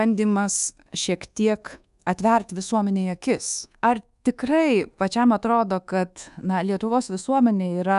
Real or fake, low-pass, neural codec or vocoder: fake; 10.8 kHz; codec, 24 kHz, 1.2 kbps, DualCodec